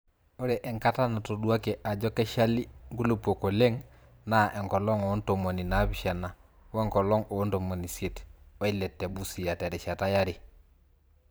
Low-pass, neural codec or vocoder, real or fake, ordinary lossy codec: none; vocoder, 44.1 kHz, 128 mel bands every 512 samples, BigVGAN v2; fake; none